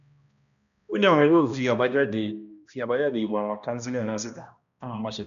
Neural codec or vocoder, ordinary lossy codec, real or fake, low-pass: codec, 16 kHz, 1 kbps, X-Codec, HuBERT features, trained on balanced general audio; none; fake; 7.2 kHz